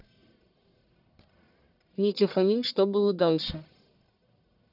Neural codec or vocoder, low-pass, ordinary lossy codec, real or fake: codec, 44.1 kHz, 1.7 kbps, Pupu-Codec; 5.4 kHz; none; fake